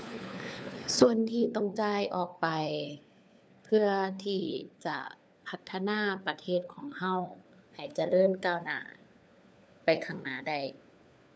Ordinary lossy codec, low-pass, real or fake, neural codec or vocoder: none; none; fake; codec, 16 kHz, 4 kbps, FunCodec, trained on LibriTTS, 50 frames a second